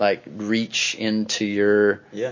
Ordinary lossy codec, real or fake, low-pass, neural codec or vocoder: MP3, 32 kbps; real; 7.2 kHz; none